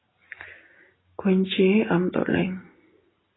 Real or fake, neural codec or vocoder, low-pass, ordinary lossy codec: real; none; 7.2 kHz; AAC, 16 kbps